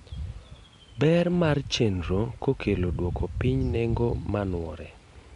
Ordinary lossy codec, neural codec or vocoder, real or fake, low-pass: MP3, 64 kbps; none; real; 10.8 kHz